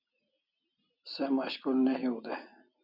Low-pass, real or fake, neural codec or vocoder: 5.4 kHz; real; none